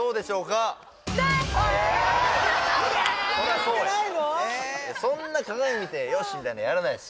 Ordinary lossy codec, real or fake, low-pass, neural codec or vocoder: none; real; none; none